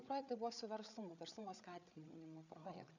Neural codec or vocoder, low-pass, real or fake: codec, 16 kHz, 8 kbps, FreqCodec, larger model; 7.2 kHz; fake